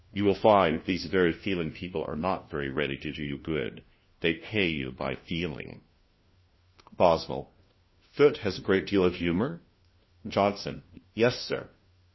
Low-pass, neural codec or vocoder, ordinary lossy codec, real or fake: 7.2 kHz; codec, 16 kHz, 1 kbps, FunCodec, trained on LibriTTS, 50 frames a second; MP3, 24 kbps; fake